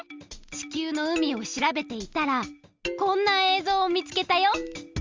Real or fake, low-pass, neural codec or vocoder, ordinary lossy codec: real; 7.2 kHz; none; Opus, 32 kbps